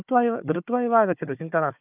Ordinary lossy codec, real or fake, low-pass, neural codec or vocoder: none; fake; 3.6 kHz; codec, 16 kHz, 4 kbps, FunCodec, trained on Chinese and English, 50 frames a second